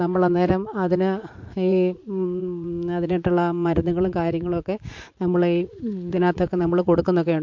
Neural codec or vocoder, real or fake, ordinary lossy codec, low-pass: vocoder, 44.1 kHz, 80 mel bands, Vocos; fake; MP3, 48 kbps; 7.2 kHz